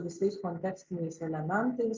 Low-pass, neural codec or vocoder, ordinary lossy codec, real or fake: 7.2 kHz; none; Opus, 24 kbps; real